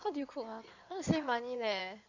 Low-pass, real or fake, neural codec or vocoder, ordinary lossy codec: 7.2 kHz; fake; codec, 16 kHz in and 24 kHz out, 2.2 kbps, FireRedTTS-2 codec; none